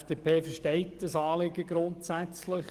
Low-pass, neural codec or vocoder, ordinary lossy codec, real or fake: 14.4 kHz; codec, 44.1 kHz, 7.8 kbps, DAC; Opus, 24 kbps; fake